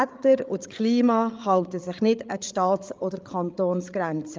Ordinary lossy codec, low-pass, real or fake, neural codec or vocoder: Opus, 32 kbps; 7.2 kHz; fake; codec, 16 kHz, 8 kbps, FreqCodec, larger model